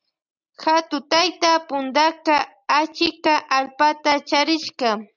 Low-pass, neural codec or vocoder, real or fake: 7.2 kHz; none; real